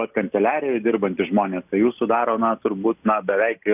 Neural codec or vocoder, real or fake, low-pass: none; real; 3.6 kHz